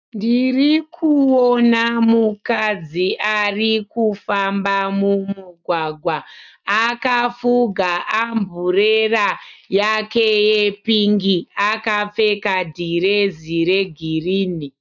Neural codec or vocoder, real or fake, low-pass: none; real; 7.2 kHz